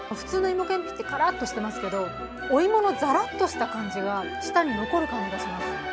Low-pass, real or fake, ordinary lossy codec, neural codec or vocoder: none; real; none; none